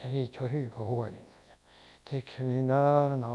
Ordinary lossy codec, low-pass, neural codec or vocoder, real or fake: none; none; codec, 24 kHz, 0.9 kbps, WavTokenizer, large speech release; fake